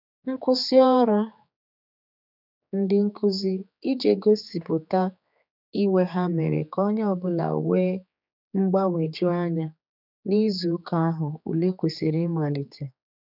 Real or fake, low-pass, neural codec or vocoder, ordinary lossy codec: fake; 5.4 kHz; codec, 16 kHz, 4 kbps, X-Codec, HuBERT features, trained on general audio; none